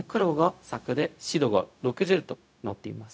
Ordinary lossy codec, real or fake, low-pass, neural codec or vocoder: none; fake; none; codec, 16 kHz, 0.4 kbps, LongCat-Audio-Codec